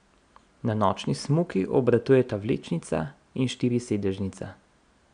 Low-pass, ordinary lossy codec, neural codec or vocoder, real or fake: 9.9 kHz; none; none; real